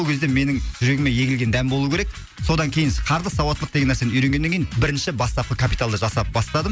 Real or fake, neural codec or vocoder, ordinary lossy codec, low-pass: real; none; none; none